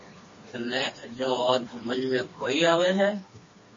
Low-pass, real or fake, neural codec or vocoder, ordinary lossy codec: 7.2 kHz; fake; codec, 16 kHz, 2 kbps, FreqCodec, smaller model; MP3, 32 kbps